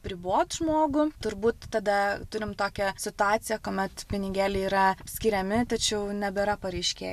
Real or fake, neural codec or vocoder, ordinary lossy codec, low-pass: real; none; AAC, 96 kbps; 14.4 kHz